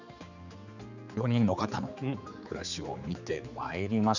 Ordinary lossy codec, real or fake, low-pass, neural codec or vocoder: none; fake; 7.2 kHz; codec, 16 kHz, 4 kbps, X-Codec, HuBERT features, trained on general audio